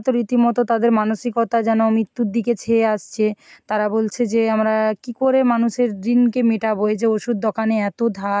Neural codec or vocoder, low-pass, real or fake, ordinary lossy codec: none; none; real; none